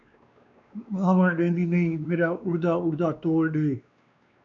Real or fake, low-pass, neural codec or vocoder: fake; 7.2 kHz; codec, 16 kHz, 2 kbps, X-Codec, WavLM features, trained on Multilingual LibriSpeech